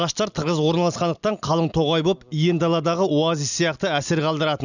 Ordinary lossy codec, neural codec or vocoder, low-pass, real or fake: none; none; 7.2 kHz; real